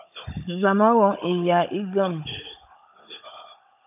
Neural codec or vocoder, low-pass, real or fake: codec, 16 kHz, 16 kbps, FunCodec, trained on Chinese and English, 50 frames a second; 3.6 kHz; fake